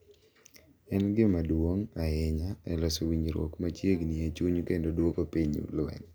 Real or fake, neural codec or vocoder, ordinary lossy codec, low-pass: real; none; none; none